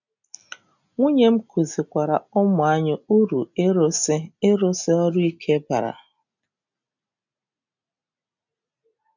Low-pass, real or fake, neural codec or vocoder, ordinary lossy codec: 7.2 kHz; real; none; none